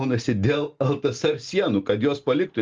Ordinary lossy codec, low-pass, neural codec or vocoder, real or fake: Opus, 32 kbps; 7.2 kHz; none; real